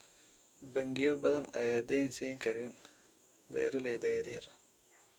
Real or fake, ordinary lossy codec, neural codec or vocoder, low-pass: fake; none; codec, 44.1 kHz, 2.6 kbps, DAC; 19.8 kHz